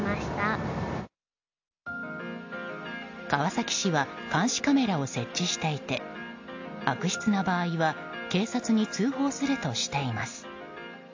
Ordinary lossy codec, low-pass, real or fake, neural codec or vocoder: AAC, 48 kbps; 7.2 kHz; real; none